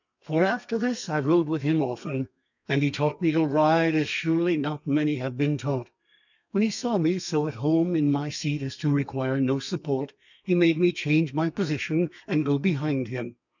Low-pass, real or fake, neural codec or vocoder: 7.2 kHz; fake; codec, 32 kHz, 1.9 kbps, SNAC